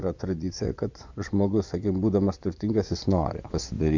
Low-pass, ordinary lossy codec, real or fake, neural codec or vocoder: 7.2 kHz; MP3, 64 kbps; fake; vocoder, 24 kHz, 100 mel bands, Vocos